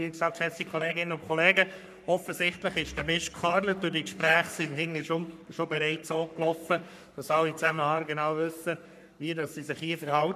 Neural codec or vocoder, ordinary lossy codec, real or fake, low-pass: codec, 44.1 kHz, 3.4 kbps, Pupu-Codec; none; fake; 14.4 kHz